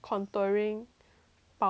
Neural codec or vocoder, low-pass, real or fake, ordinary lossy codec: none; none; real; none